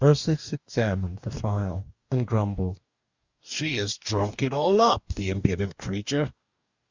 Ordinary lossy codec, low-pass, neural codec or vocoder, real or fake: Opus, 64 kbps; 7.2 kHz; codec, 44.1 kHz, 2.6 kbps, DAC; fake